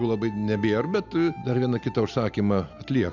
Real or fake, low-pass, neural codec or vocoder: real; 7.2 kHz; none